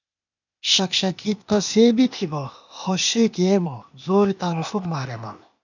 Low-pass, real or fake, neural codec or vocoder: 7.2 kHz; fake; codec, 16 kHz, 0.8 kbps, ZipCodec